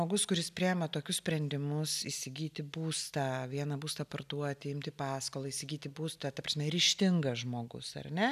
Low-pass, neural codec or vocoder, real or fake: 14.4 kHz; none; real